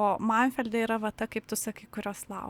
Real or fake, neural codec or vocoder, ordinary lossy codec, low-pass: real; none; Opus, 64 kbps; 19.8 kHz